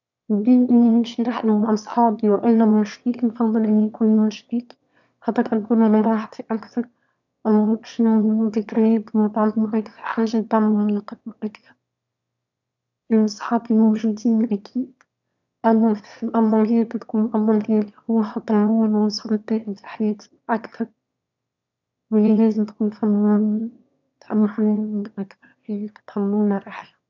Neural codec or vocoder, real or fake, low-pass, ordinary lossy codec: autoencoder, 22.05 kHz, a latent of 192 numbers a frame, VITS, trained on one speaker; fake; 7.2 kHz; none